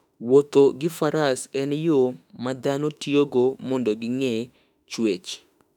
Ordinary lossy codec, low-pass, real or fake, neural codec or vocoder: none; 19.8 kHz; fake; autoencoder, 48 kHz, 32 numbers a frame, DAC-VAE, trained on Japanese speech